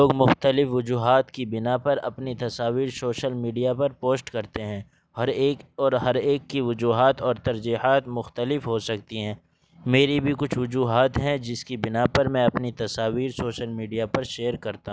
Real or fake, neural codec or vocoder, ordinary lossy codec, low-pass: real; none; none; none